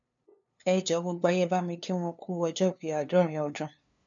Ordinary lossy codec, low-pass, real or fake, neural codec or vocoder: MP3, 96 kbps; 7.2 kHz; fake; codec, 16 kHz, 2 kbps, FunCodec, trained on LibriTTS, 25 frames a second